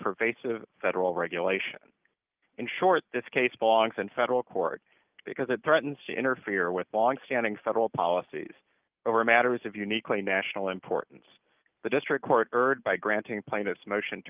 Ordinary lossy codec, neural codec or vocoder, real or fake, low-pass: Opus, 24 kbps; none; real; 3.6 kHz